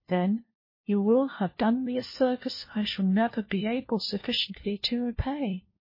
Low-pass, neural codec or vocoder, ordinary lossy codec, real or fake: 5.4 kHz; codec, 16 kHz, 1 kbps, FunCodec, trained on LibriTTS, 50 frames a second; MP3, 24 kbps; fake